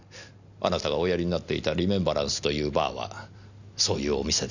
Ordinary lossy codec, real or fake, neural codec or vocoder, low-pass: none; real; none; 7.2 kHz